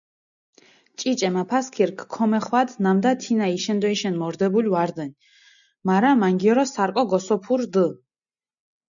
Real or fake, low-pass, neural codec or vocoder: real; 7.2 kHz; none